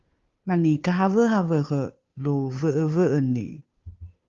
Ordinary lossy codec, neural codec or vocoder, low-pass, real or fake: Opus, 32 kbps; codec, 16 kHz, 2 kbps, FunCodec, trained on Chinese and English, 25 frames a second; 7.2 kHz; fake